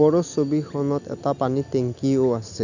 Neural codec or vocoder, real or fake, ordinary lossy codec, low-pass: none; real; none; 7.2 kHz